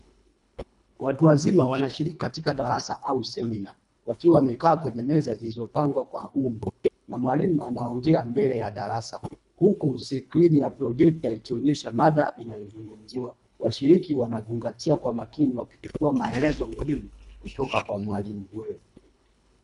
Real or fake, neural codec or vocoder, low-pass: fake; codec, 24 kHz, 1.5 kbps, HILCodec; 10.8 kHz